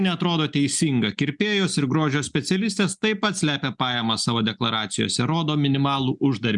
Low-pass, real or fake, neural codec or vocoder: 10.8 kHz; real; none